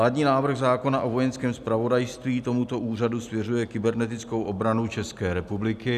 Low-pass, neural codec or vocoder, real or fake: 14.4 kHz; none; real